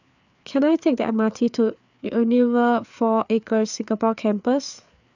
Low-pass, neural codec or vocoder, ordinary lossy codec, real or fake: 7.2 kHz; codec, 16 kHz, 4 kbps, FreqCodec, larger model; none; fake